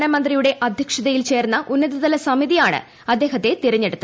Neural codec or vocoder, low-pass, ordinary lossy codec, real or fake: none; none; none; real